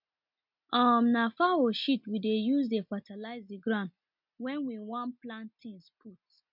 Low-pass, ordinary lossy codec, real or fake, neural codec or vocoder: 5.4 kHz; MP3, 48 kbps; real; none